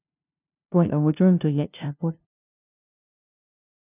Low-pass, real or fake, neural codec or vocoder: 3.6 kHz; fake; codec, 16 kHz, 0.5 kbps, FunCodec, trained on LibriTTS, 25 frames a second